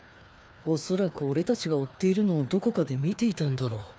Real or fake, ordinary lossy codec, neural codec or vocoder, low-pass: fake; none; codec, 16 kHz, 2 kbps, FreqCodec, larger model; none